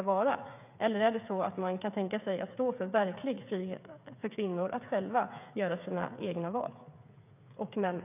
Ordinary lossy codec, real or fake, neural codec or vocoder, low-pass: none; fake; codec, 16 kHz, 8 kbps, FreqCodec, smaller model; 3.6 kHz